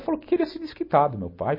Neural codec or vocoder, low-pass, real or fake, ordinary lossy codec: none; 5.4 kHz; real; MP3, 24 kbps